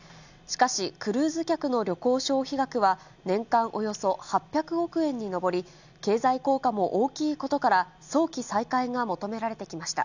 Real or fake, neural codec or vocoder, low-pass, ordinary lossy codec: real; none; 7.2 kHz; none